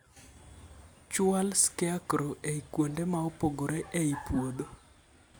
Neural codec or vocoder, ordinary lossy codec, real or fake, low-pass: none; none; real; none